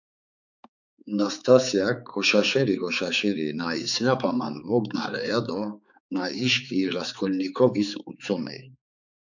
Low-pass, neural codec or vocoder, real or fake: 7.2 kHz; codec, 16 kHz, 4 kbps, X-Codec, HuBERT features, trained on balanced general audio; fake